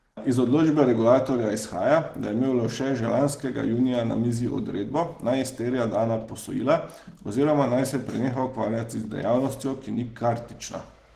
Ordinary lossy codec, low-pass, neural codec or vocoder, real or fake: Opus, 16 kbps; 14.4 kHz; none; real